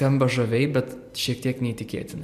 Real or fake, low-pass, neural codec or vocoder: fake; 14.4 kHz; vocoder, 44.1 kHz, 128 mel bands every 512 samples, BigVGAN v2